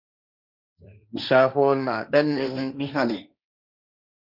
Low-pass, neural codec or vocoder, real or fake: 5.4 kHz; codec, 16 kHz, 1.1 kbps, Voila-Tokenizer; fake